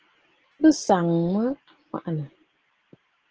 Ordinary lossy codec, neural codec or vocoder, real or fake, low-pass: Opus, 16 kbps; none; real; 7.2 kHz